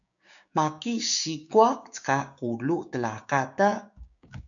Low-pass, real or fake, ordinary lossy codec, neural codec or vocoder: 7.2 kHz; fake; MP3, 96 kbps; codec, 16 kHz, 6 kbps, DAC